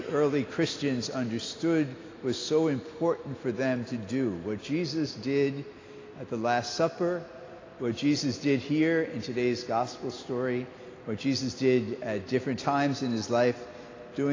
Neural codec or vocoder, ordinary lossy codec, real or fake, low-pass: none; AAC, 32 kbps; real; 7.2 kHz